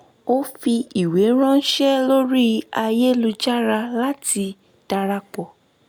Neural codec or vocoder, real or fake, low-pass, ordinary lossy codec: none; real; none; none